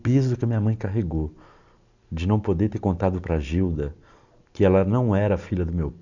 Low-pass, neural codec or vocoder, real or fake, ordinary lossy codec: 7.2 kHz; none; real; none